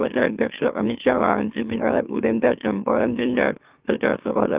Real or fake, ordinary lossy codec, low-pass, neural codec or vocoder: fake; Opus, 32 kbps; 3.6 kHz; autoencoder, 44.1 kHz, a latent of 192 numbers a frame, MeloTTS